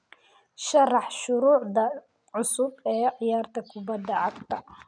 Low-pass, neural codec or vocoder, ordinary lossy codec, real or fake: 9.9 kHz; none; none; real